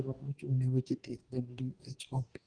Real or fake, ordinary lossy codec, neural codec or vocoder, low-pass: fake; Opus, 24 kbps; codec, 44.1 kHz, 2.6 kbps, DAC; 9.9 kHz